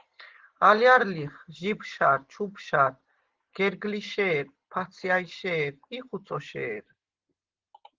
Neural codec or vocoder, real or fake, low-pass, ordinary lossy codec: none; real; 7.2 kHz; Opus, 16 kbps